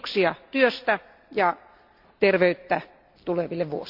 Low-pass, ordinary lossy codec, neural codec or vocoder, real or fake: 5.4 kHz; AAC, 48 kbps; none; real